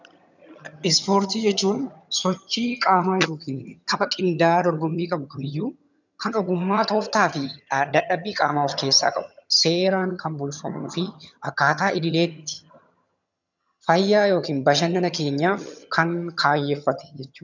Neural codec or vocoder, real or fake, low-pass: vocoder, 22.05 kHz, 80 mel bands, HiFi-GAN; fake; 7.2 kHz